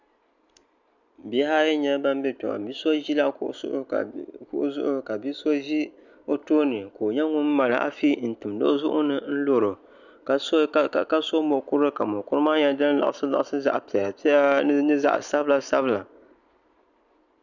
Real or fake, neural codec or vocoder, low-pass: real; none; 7.2 kHz